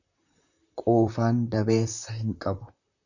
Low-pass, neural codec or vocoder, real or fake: 7.2 kHz; vocoder, 44.1 kHz, 128 mel bands, Pupu-Vocoder; fake